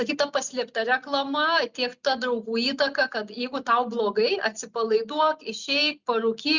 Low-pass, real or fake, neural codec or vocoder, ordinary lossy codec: 7.2 kHz; real; none; Opus, 64 kbps